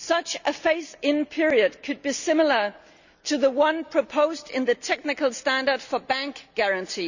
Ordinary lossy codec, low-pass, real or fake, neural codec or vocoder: none; 7.2 kHz; real; none